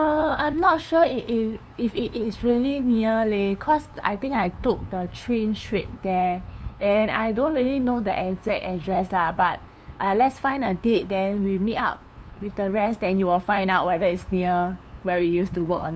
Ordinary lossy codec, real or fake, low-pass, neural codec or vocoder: none; fake; none; codec, 16 kHz, 2 kbps, FunCodec, trained on LibriTTS, 25 frames a second